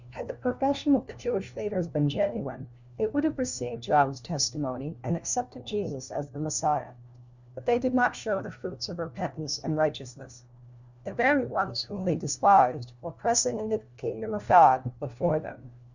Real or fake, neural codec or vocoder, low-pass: fake; codec, 16 kHz, 1 kbps, FunCodec, trained on LibriTTS, 50 frames a second; 7.2 kHz